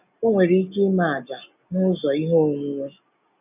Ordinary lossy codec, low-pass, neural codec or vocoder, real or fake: none; 3.6 kHz; none; real